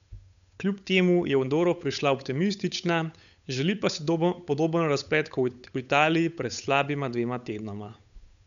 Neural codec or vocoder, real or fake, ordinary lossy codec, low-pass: codec, 16 kHz, 8 kbps, FunCodec, trained on Chinese and English, 25 frames a second; fake; none; 7.2 kHz